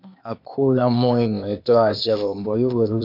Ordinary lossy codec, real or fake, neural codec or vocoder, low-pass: MP3, 48 kbps; fake; codec, 16 kHz, 0.8 kbps, ZipCodec; 5.4 kHz